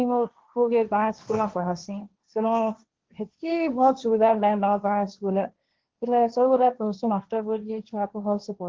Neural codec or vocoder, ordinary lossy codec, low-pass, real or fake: codec, 16 kHz, 1.1 kbps, Voila-Tokenizer; Opus, 16 kbps; 7.2 kHz; fake